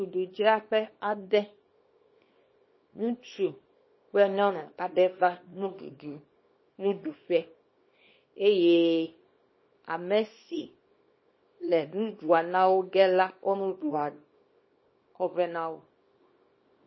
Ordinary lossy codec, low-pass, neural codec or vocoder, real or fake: MP3, 24 kbps; 7.2 kHz; codec, 24 kHz, 0.9 kbps, WavTokenizer, small release; fake